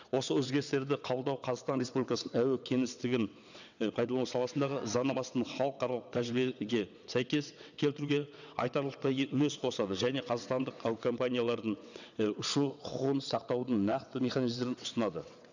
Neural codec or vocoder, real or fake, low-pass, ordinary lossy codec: vocoder, 22.05 kHz, 80 mel bands, WaveNeXt; fake; 7.2 kHz; none